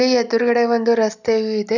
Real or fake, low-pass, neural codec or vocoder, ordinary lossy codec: real; 7.2 kHz; none; none